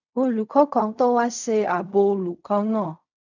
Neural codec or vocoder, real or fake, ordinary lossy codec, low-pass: codec, 16 kHz in and 24 kHz out, 0.4 kbps, LongCat-Audio-Codec, fine tuned four codebook decoder; fake; none; 7.2 kHz